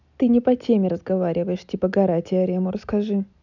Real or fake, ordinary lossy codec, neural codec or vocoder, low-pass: real; none; none; 7.2 kHz